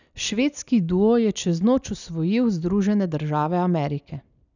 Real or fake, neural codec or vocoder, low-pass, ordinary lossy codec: real; none; 7.2 kHz; none